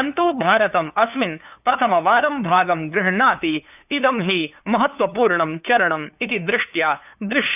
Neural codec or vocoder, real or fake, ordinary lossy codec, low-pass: codec, 16 kHz, 2 kbps, FunCodec, trained on LibriTTS, 25 frames a second; fake; none; 3.6 kHz